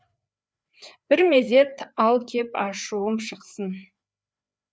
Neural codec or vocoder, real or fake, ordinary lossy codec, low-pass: codec, 16 kHz, 4 kbps, FreqCodec, larger model; fake; none; none